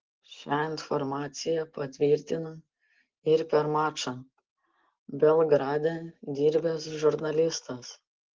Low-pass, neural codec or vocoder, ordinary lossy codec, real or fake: 7.2 kHz; none; Opus, 16 kbps; real